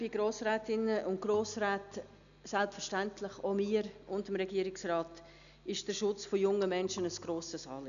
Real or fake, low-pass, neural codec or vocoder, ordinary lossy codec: real; 7.2 kHz; none; none